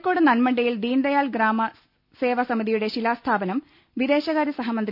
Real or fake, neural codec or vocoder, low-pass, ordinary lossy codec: real; none; 5.4 kHz; none